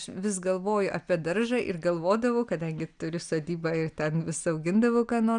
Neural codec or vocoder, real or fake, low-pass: none; real; 9.9 kHz